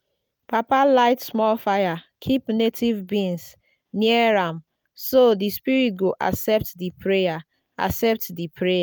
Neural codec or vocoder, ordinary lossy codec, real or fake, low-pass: none; none; real; none